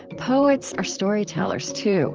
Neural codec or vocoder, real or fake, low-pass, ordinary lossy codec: vocoder, 44.1 kHz, 128 mel bands, Pupu-Vocoder; fake; 7.2 kHz; Opus, 24 kbps